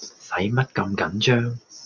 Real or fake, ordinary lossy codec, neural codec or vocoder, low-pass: real; Opus, 64 kbps; none; 7.2 kHz